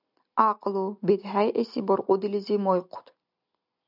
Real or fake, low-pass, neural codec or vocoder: real; 5.4 kHz; none